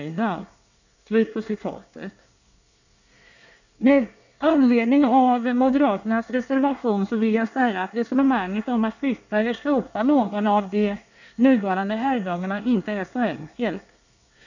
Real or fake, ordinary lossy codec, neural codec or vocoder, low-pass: fake; none; codec, 24 kHz, 1 kbps, SNAC; 7.2 kHz